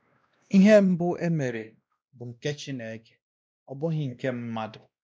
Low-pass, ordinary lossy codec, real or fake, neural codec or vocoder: none; none; fake; codec, 16 kHz, 1 kbps, X-Codec, WavLM features, trained on Multilingual LibriSpeech